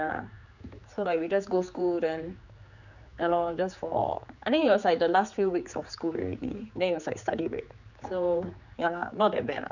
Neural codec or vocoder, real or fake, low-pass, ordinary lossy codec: codec, 16 kHz, 4 kbps, X-Codec, HuBERT features, trained on general audio; fake; 7.2 kHz; none